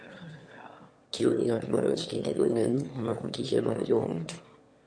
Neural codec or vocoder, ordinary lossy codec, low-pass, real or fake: autoencoder, 22.05 kHz, a latent of 192 numbers a frame, VITS, trained on one speaker; MP3, 48 kbps; 9.9 kHz; fake